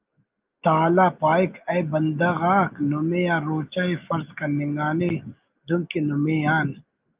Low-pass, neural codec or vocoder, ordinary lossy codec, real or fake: 3.6 kHz; none; Opus, 32 kbps; real